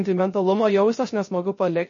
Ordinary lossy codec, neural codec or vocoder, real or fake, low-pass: MP3, 32 kbps; codec, 16 kHz, 0.3 kbps, FocalCodec; fake; 7.2 kHz